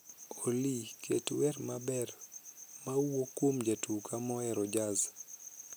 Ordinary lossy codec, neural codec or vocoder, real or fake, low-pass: none; none; real; none